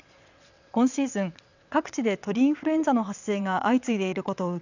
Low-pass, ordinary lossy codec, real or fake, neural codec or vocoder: 7.2 kHz; none; fake; vocoder, 22.05 kHz, 80 mel bands, WaveNeXt